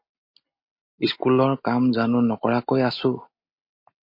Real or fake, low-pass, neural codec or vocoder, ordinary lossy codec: real; 5.4 kHz; none; MP3, 32 kbps